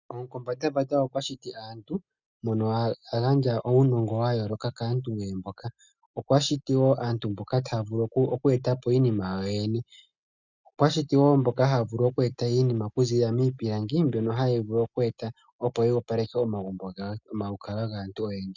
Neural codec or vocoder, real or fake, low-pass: none; real; 7.2 kHz